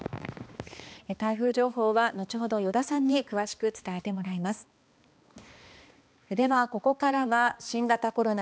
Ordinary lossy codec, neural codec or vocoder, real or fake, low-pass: none; codec, 16 kHz, 2 kbps, X-Codec, HuBERT features, trained on balanced general audio; fake; none